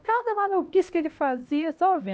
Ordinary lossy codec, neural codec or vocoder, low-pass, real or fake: none; codec, 16 kHz, 1 kbps, X-Codec, HuBERT features, trained on LibriSpeech; none; fake